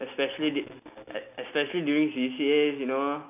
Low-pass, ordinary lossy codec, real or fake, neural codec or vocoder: 3.6 kHz; none; real; none